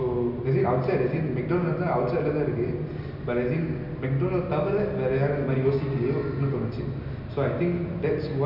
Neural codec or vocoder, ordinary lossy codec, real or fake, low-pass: none; none; real; 5.4 kHz